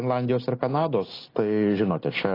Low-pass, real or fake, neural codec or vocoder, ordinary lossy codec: 5.4 kHz; real; none; AAC, 24 kbps